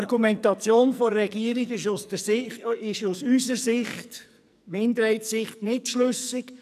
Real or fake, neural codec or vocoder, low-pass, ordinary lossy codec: fake; codec, 44.1 kHz, 2.6 kbps, SNAC; 14.4 kHz; none